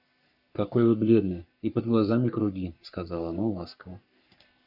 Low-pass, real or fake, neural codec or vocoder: 5.4 kHz; fake; codec, 44.1 kHz, 3.4 kbps, Pupu-Codec